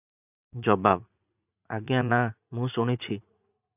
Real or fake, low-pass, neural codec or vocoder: fake; 3.6 kHz; vocoder, 44.1 kHz, 80 mel bands, Vocos